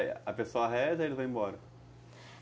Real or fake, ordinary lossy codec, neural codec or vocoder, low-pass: real; none; none; none